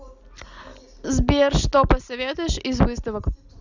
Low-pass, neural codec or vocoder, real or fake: 7.2 kHz; none; real